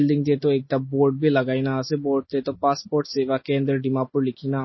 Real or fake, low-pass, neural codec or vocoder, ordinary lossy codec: real; 7.2 kHz; none; MP3, 24 kbps